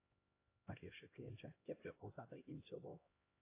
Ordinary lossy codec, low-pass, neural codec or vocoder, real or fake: AAC, 24 kbps; 3.6 kHz; codec, 16 kHz, 1 kbps, X-Codec, HuBERT features, trained on LibriSpeech; fake